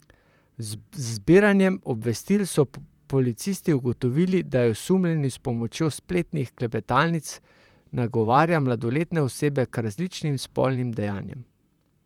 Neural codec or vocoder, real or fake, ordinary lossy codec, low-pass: vocoder, 44.1 kHz, 128 mel bands, Pupu-Vocoder; fake; none; 19.8 kHz